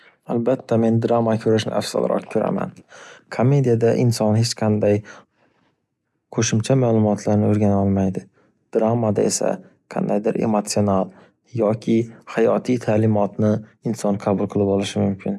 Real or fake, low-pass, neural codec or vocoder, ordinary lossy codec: real; none; none; none